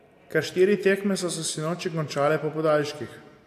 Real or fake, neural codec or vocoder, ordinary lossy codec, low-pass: real; none; AAC, 64 kbps; 14.4 kHz